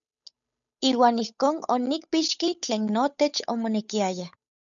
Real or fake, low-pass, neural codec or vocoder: fake; 7.2 kHz; codec, 16 kHz, 8 kbps, FunCodec, trained on Chinese and English, 25 frames a second